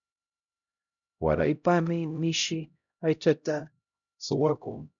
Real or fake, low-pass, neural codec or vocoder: fake; 7.2 kHz; codec, 16 kHz, 0.5 kbps, X-Codec, HuBERT features, trained on LibriSpeech